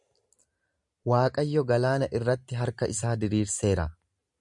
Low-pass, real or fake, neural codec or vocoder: 10.8 kHz; real; none